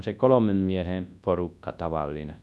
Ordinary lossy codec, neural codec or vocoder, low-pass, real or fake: none; codec, 24 kHz, 0.9 kbps, WavTokenizer, large speech release; none; fake